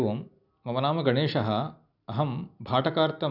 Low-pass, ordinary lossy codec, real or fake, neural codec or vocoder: 5.4 kHz; none; real; none